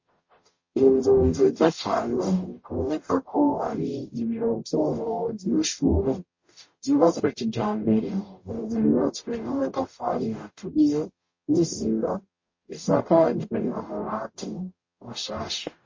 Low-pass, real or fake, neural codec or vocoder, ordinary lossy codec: 7.2 kHz; fake; codec, 44.1 kHz, 0.9 kbps, DAC; MP3, 32 kbps